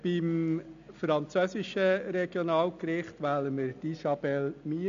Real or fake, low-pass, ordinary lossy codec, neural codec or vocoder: real; 7.2 kHz; MP3, 64 kbps; none